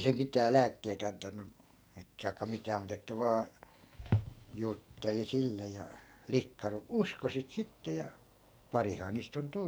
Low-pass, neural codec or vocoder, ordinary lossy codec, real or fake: none; codec, 44.1 kHz, 2.6 kbps, SNAC; none; fake